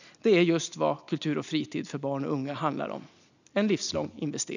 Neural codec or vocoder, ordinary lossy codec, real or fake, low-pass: none; none; real; 7.2 kHz